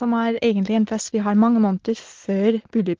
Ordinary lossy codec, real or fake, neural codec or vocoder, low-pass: Opus, 16 kbps; real; none; 7.2 kHz